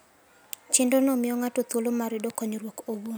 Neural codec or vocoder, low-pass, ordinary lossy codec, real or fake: none; none; none; real